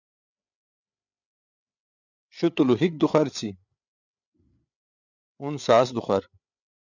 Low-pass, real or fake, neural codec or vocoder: 7.2 kHz; fake; codec, 16 kHz, 8 kbps, FreqCodec, larger model